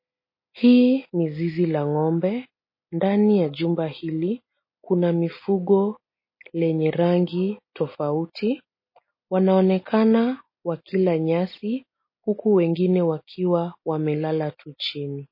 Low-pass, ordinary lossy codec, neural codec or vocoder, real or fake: 5.4 kHz; MP3, 24 kbps; none; real